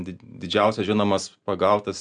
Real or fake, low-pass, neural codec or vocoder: real; 9.9 kHz; none